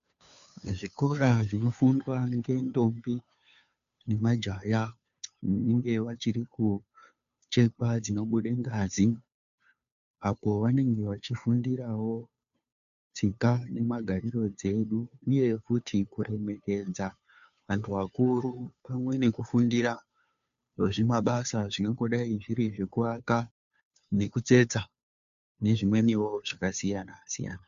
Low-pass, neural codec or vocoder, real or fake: 7.2 kHz; codec, 16 kHz, 2 kbps, FunCodec, trained on Chinese and English, 25 frames a second; fake